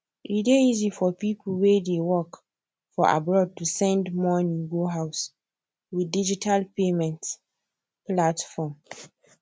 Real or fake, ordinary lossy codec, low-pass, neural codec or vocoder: real; none; none; none